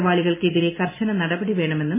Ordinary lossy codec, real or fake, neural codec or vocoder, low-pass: MP3, 16 kbps; real; none; 3.6 kHz